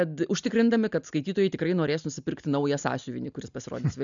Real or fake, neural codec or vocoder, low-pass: real; none; 7.2 kHz